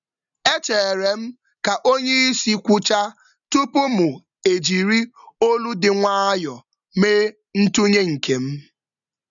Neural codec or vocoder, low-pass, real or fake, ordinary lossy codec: none; 7.2 kHz; real; none